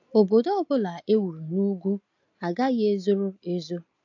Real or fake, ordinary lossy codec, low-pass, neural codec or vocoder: real; AAC, 48 kbps; 7.2 kHz; none